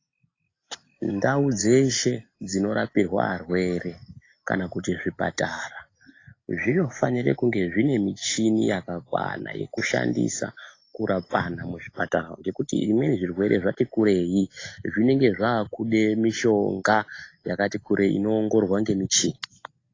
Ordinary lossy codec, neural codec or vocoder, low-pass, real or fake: AAC, 32 kbps; none; 7.2 kHz; real